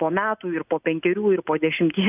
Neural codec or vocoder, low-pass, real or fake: none; 3.6 kHz; real